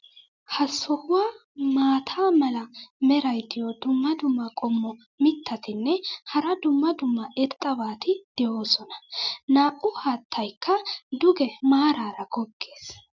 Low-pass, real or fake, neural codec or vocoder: 7.2 kHz; real; none